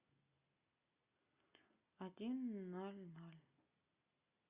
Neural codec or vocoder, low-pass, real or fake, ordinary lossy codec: none; 3.6 kHz; real; Opus, 64 kbps